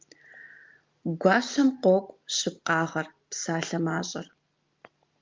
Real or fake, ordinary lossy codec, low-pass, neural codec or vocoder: real; Opus, 32 kbps; 7.2 kHz; none